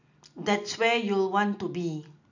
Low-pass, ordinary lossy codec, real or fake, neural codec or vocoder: 7.2 kHz; none; real; none